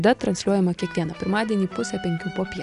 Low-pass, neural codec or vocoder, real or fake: 10.8 kHz; none; real